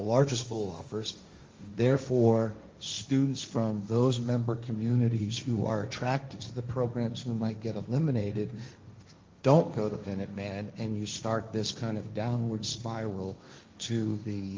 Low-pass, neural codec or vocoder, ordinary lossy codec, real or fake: 7.2 kHz; codec, 16 kHz, 1.1 kbps, Voila-Tokenizer; Opus, 32 kbps; fake